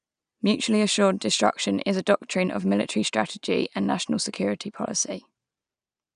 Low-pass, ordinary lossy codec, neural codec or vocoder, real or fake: 9.9 kHz; none; none; real